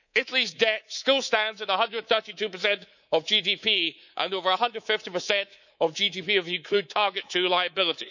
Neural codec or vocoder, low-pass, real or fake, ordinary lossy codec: codec, 24 kHz, 3.1 kbps, DualCodec; 7.2 kHz; fake; none